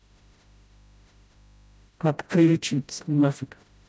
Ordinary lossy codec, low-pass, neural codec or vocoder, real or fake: none; none; codec, 16 kHz, 0.5 kbps, FreqCodec, smaller model; fake